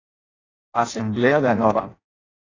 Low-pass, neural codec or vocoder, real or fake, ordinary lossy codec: 7.2 kHz; codec, 16 kHz in and 24 kHz out, 0.6 kbps, FireRedTTS-2 codec; fake; AAC, 32 kbps